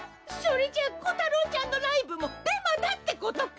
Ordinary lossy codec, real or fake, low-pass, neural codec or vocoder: none; real; none; none